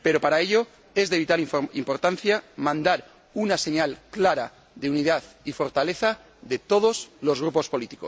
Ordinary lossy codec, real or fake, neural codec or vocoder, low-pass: none; real; none; none